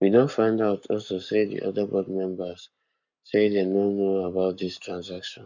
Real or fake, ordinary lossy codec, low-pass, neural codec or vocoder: fake; none; 7.2 kHz; codec, 44.1 kHz, 7.8 kbps, Pupu-Codec